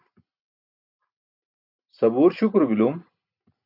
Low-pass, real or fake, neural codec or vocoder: 5.4 kHz; real; none